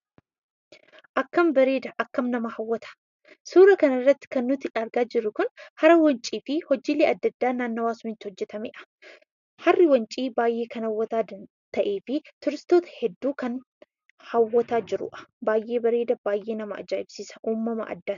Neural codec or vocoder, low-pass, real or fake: none; 7.2 kHz; real